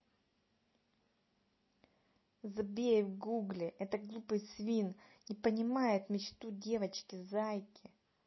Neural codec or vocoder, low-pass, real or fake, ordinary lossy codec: none; 7.2 kHz; real; MP3, 24 kbps